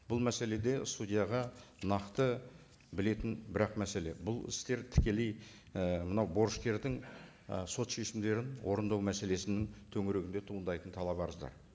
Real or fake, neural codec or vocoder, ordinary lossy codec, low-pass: real; none; none; none